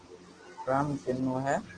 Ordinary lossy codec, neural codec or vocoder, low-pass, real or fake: Opus, 16 kbps; none; 9.9 kHz; real